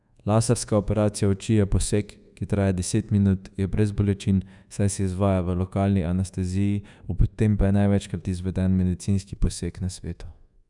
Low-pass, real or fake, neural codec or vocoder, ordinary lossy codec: 10.8 kHz; fake; codec, 24 kHz, 1.2 kbps, DualCodec; none